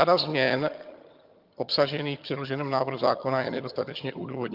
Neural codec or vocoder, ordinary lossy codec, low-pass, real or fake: vocoder, 22.05 kHz, 80 mel bands, HiFi-GAN; Opus, 24 kbps; 5.4 kHz; fake